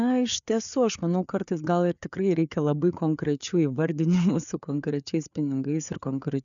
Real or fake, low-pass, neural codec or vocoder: fake; 7.2 kHz; codec, 16 kHz, 4 kbps, FreqCodec, larger model